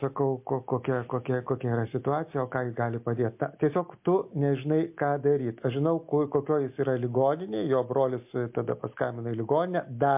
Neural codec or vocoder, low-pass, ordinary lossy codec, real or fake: none; 3.6 kHz; MP3, 32 kbps; real